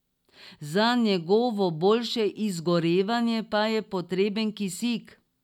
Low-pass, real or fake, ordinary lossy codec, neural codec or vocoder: 19.8 kHz; real; none; none